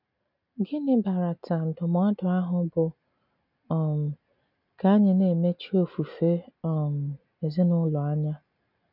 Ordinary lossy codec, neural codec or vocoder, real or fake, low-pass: none; none; real; 5.4 kHz